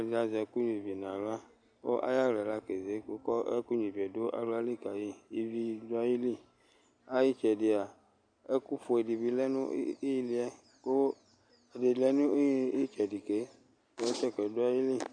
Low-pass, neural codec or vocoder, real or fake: 9.9 kHz; none; real